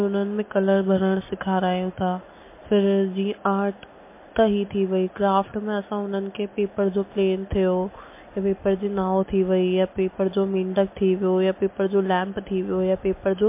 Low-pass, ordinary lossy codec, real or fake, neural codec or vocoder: 3.6 kHz; MP3, 24 kbps; real; none